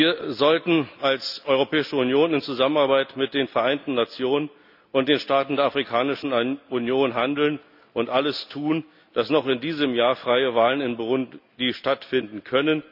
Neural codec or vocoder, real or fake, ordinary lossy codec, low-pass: none; real; none; 5.4 kHz